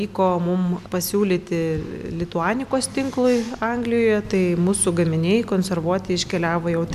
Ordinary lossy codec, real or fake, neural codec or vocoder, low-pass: MP3, 96 kbps; real; none; 14.4 kHz